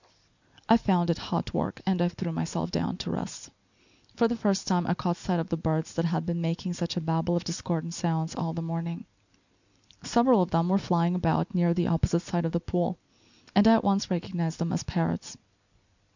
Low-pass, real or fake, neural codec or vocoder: 7.2 kHz; real; none